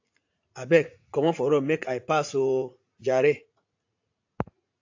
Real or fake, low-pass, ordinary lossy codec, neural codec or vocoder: fake; 7.2 kHz; AAC, 48 kbps; vocoder, 44.1 kHz, 80 mel bands, Vocos